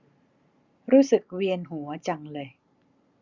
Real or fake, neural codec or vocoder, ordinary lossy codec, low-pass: real; none; Opus, 64 kbps; 7.2 kHz